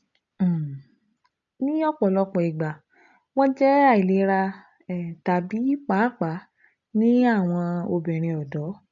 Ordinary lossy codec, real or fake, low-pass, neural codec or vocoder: none; real; 7.2 kHz; none